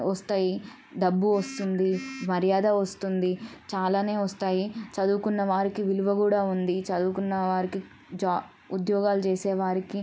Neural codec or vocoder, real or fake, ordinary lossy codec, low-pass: none; real; none; none